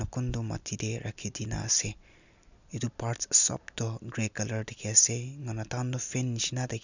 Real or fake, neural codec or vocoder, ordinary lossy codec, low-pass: real; none; none; 7.2 kHz